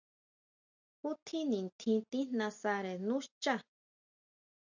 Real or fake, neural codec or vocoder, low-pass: real; none; 7.2 kHz